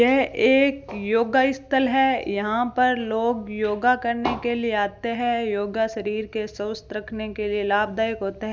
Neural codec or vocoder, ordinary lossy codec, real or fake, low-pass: none; none; real; none